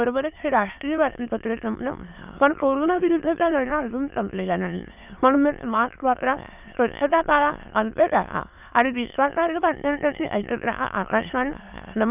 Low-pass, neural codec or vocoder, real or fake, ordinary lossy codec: 3.6 kHz; autoencoder, 22.05 kHz, a latent of 192 numbers a frame, VITS, trained on many speakers; fake; none